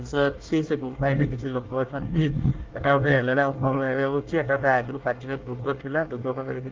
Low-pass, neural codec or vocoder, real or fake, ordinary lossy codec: 7.2 kHz; codec, 24 kHz, 1 kbps, SNAC; fake; Opus, 32 kbps